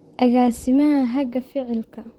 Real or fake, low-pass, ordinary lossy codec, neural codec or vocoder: fake; 19.8 kHz; Opus, 16 kbps; vocoder, 44.1 kHz, 128 mel bands, Pupu-Vocoder